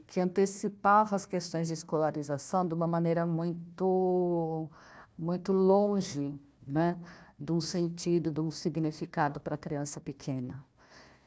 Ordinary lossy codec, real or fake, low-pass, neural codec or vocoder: none; fake; none; codec, 16 kHz, 1 kbps, FunCodec, trained on Chinese and English, 50 frames a second